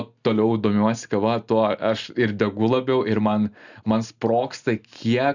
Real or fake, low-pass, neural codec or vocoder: real; 7.2 kHz; none